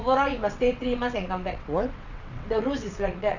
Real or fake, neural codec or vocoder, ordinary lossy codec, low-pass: fake; vocoder, 22.05 kHz, 80 mel bands, WaveNeXt; none; 7.2 kHz